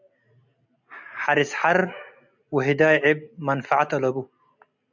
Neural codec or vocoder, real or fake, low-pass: none; real; 7.2 kHz